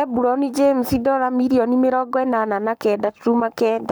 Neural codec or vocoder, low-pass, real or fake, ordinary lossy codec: codec, 44.1 kHz, 7.8 kbps, Pupu-Codec; none; fake; none